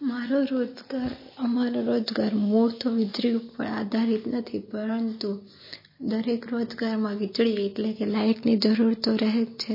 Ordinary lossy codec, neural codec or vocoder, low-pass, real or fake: MP3, 24 kbps; none; 5.4 kHz; real